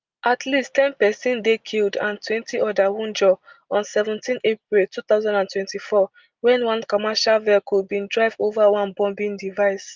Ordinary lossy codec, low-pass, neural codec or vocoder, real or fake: Opus, 24 kbps; 7.2 kHz; none; real